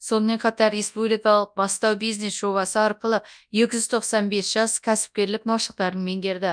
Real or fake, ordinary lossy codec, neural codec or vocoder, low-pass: fake; none; codec, 24 kHz, 0.9 kbps, WavTokenizer, large speech release; 9.9 kHz